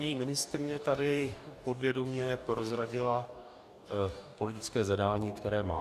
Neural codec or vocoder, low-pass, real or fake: codec, 44.1 kHz, 2.6 kbps, DAC; 14.4 kHz; fake